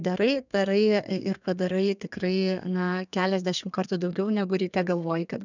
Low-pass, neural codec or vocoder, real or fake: 7.2 kHz; codec, 32 kHz, 1.9 kbps, SNAC; fake